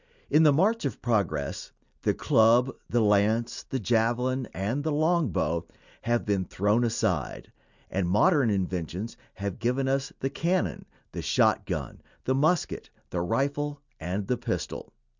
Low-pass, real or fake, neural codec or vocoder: 7.2 kHz; real; none